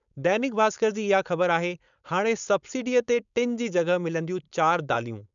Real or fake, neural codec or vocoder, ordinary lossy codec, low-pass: fake; codec, 16 kHz, 4.8 kbps, FACodec; none; 7.2 kHz